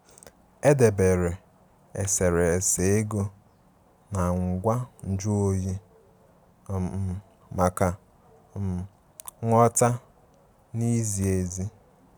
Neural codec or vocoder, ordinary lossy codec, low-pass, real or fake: none; none; none; real